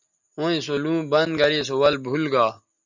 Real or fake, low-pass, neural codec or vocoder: real; 7.2 kHz; none